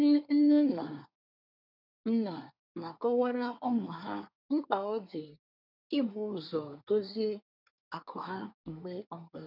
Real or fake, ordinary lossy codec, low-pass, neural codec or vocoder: fake; none; 5.4 kHz; codec, 24 kHz, 1 kbps, SNAC